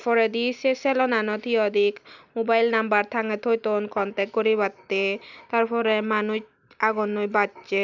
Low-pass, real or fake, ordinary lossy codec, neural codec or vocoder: 7.2 kHz; real; none; none